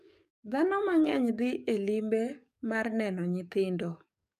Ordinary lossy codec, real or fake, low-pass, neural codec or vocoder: none; fake; 14.4 kHz; codec, 44.1 kHz, 7.8 kbps, DAC